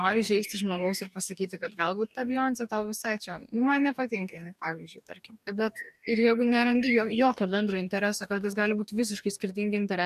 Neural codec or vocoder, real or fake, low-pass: codec, 44.1 kHz, 2.6 kbps, DAC; fake; 14.4 kHz